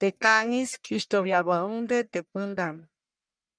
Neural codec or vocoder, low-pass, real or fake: codec, 44.1 kHz, 1.7 kbps, Pupu-Codec; 9.9 kHz; fake